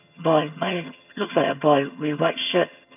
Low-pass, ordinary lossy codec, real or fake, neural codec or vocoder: 3.6 kHz; none; fake; vocoder, 22.05 kHz, 80 mel bands, HiFi-GAN